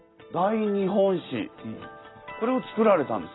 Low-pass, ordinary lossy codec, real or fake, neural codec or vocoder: 7.2 kHz; AAC, 16 kbps; real; none